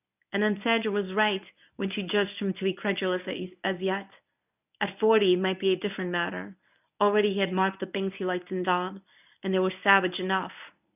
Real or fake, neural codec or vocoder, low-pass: fake; codec, 24 kHz, 0.9 kbps, WavTokenizer, medium speech release version 1; 3.6 kHz